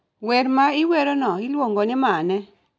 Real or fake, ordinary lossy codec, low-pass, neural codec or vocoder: real; none; none; none